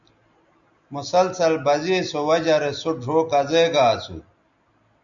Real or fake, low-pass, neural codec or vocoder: real; 7.2 kHz; none